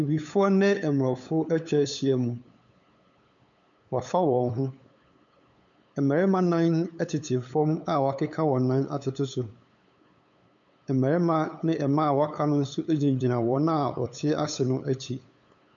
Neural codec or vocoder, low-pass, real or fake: codec, 16 kHz, 8 kbps, FunCodec, trained on LibriTTS, 25 frames a second; 7.2 kHz; fake